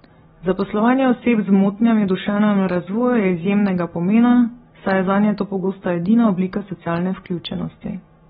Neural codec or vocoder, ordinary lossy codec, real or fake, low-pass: none; AAC, 16 kbps; real; 10.8 kHz